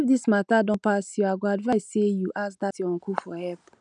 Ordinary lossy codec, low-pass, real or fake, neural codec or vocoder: none; 9.9 kHz; real; none